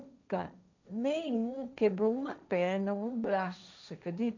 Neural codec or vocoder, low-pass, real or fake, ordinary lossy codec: codec, 16 kHz, 1.1 kbps, Voila-Tokenizer; 7.2 kHz; fake; none